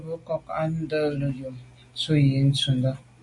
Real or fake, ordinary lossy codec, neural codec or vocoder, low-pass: real; MP3, 48 kbps; none; 10.8 kHz